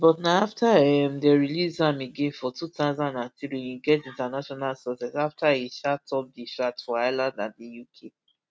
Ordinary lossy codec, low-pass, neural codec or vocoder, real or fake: none; none; none; real